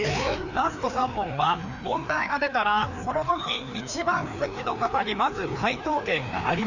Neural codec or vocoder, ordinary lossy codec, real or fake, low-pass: codec, 16 kHz, 2 kbps, FreqCodec, larger model; none; fake; 7.2 kHz